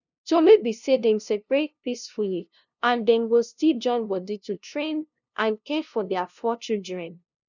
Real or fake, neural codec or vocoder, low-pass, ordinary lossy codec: fake; codec, 16 kHz, 0.5 kbps, FunCodec, trained on LibriTTS, 25 frames a second; 7.2 kHz; none